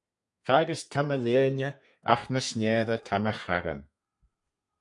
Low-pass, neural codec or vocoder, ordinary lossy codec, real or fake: 10.8 kHz; codec, 32 kHz, 1.9 kbps, SNAC; MP3, 64 kbps; fake